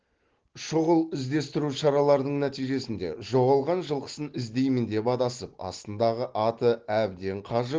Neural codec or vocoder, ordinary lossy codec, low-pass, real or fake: none; Opus, 16 kbps; 7.2 kHz; real